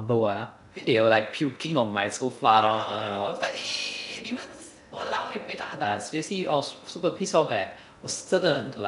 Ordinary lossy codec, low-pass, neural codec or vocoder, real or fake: none; 10.8 kHz; codec, 16 kHz in and 24 kHz out, 0.6 kbps, FocalCodec, streaming, 2048 codes; fake